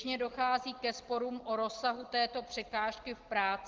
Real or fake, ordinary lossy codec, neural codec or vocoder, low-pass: real; Opus, 16 kbps; none; 7.2 kHz